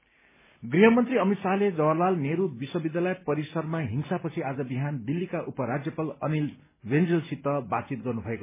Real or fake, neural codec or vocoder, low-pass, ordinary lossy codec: real; none; 3.6 kHz; MP3, 16 kbps